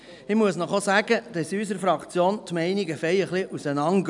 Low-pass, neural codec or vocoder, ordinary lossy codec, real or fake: 10.8 kHz; none; none; real